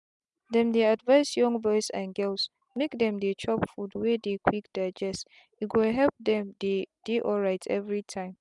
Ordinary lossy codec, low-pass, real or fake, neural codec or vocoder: none; 10.8 kHz; real; none